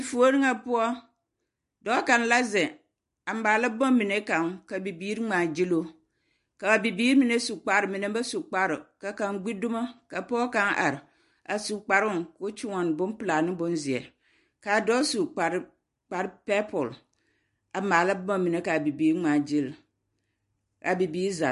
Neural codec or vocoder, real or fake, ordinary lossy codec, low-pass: none; real; MP3, 48 kbps; 14.4 kHz